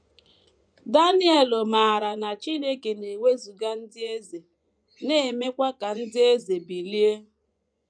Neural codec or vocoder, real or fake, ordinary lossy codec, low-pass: vocoder, 24 kHz, 100 mel bands, Vocos; fake; none; 9.9 kHz